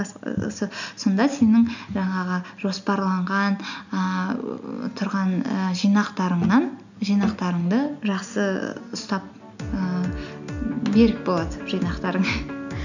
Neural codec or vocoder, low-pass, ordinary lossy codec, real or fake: none; 7.2 kHz; none; real